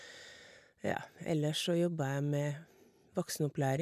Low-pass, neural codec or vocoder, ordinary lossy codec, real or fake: 14.4 kHz; none; none; real